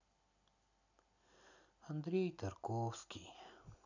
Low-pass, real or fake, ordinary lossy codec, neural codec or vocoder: 7.2 kHz; real; none; none